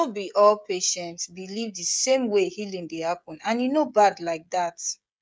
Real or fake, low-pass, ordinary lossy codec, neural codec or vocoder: fake; none; none; codec, 16 kHz, 16 kbps, FreqCodec, smaller model